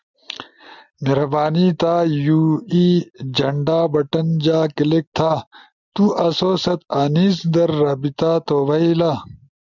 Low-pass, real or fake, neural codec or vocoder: 7.2 kHz; real; none